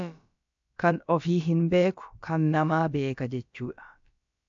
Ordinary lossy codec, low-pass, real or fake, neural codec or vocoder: AAC, 64 kbps; 7.2 kHz; fake; codec, 16 kHz, about 1 kbps, DyCAST, with the encoder's durations